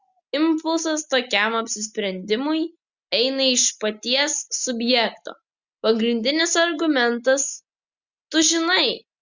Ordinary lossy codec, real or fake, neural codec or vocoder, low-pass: Opus, 64 kbps; real; none; 7.2 kHz